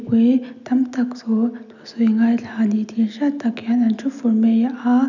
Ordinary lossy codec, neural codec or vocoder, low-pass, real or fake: none; none; 7.2 kHz; real